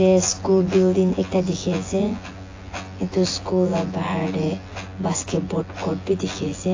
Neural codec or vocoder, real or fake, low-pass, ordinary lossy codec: vocoder, 24 kHz, 100 mel bands, Vocos; fake; 7.2 kHz; AAC, 32 kbps